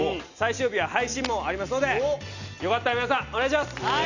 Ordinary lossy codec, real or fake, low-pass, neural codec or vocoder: none; real; 7.2 kHz; none